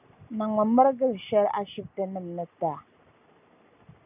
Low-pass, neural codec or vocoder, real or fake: 3.6 kHz; none; real